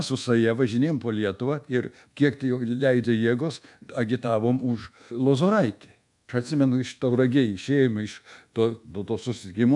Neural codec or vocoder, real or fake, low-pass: codec, 24 kHz, 1.2 kbps, DualCodec; fake; 10.8 kHz